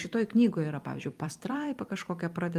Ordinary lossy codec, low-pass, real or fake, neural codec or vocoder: Opus, 32 kbps; 14.4 kHz; fake; vocoder, 44.1 kHz, 128 mel bands every 256 samples, BigVGAN v2